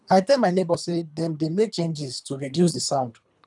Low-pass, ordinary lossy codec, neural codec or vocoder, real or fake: 10.8 kHz; none; codec, 24 kHz, 3 kbps, HILCodec; fake